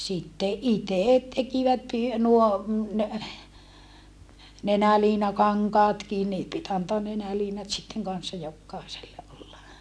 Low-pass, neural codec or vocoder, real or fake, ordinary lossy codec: none; none; real; none